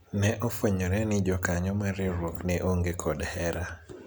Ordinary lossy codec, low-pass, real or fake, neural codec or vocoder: none; none; real; none